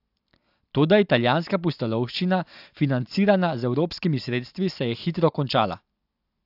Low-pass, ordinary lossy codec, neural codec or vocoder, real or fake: 5.4 kHz; AAC, 48 kbps; none; real